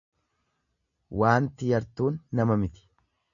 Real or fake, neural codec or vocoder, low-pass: real; none; 7.2 kHz